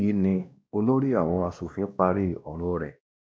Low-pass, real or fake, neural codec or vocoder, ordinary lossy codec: none; fake; codec, 16 kHz, 1 kbps, X-Codec, WavLM features, trained on Multilingual LibriSpeech; none